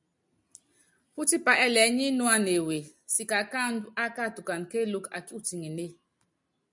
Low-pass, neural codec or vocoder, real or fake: 10.8 kHz; none; real